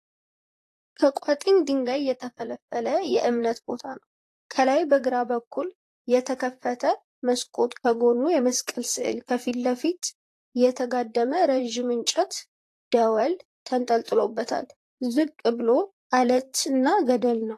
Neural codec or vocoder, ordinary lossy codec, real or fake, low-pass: vocoder, 44.1 kHz, 128 mel bands, Pupu-Vocoder; AAC, 48 kbps; fake; 14.4 kHz